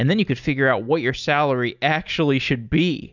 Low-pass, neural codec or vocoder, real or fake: 7.2 kHz; none; real